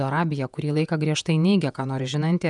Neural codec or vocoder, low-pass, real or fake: vocoder, 48 kHz, 128 mel bands, Vocos; 10.8 kHz; fake